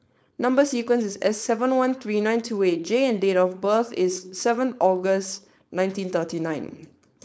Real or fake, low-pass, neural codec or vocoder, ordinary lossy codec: fake; none; codec, 16 kHz, 4.8 kbps, FACodec; none